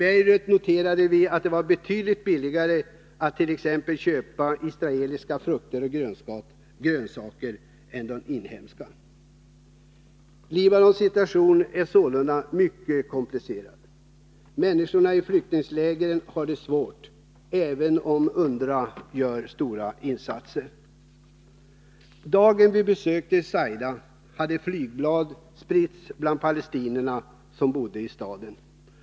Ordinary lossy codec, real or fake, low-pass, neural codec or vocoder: none; real; none; none